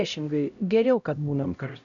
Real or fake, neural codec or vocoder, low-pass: fake; codec, 16 kHz, 0.5 kbps, X-Codec, HuBERT features, trained on LibriSpeech; 7.2 kHz